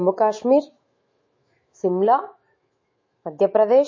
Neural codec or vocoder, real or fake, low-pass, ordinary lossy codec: autoencoder, 48 kHz, 128 numbers a frame, DAC-VAE, trained on Japanese speech; fake; 7.2 kHz; MP3, 32 kbps